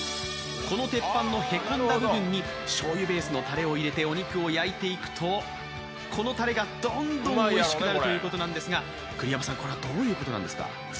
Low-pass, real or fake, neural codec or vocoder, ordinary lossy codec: none; real; none; none